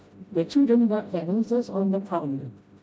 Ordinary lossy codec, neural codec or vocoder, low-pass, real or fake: none; codec, 16 kHz, 0.5 kbps, FreqCodec, smaller model; none; fake